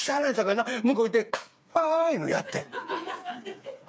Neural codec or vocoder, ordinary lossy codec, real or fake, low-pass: codec, 16 kHz, 4 kbps, FreqCodec, smaller model; none; fake; none